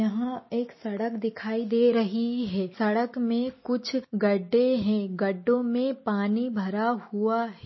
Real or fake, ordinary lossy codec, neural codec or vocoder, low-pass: real; MP3, 24 kbps; none; 7.2 kHz